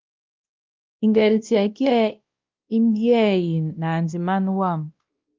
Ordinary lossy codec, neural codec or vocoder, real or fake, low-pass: Opus, 32 kbps; codec, 16 kHz, 1 kbps, X-Codec, WavLM features, trained on Multilingual LibriSpeech; fake; 7.2 kHz